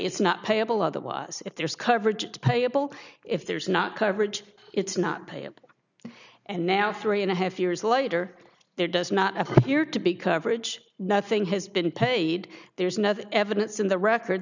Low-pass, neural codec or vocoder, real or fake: 7.2 kHz; none; real